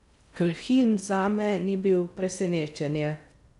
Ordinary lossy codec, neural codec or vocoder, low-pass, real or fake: none; codec, 16 kHz in and 24 kHz out, 0.6 kbps, FocalCodec, streaming, 2048 codes; 10.8 kHz; fake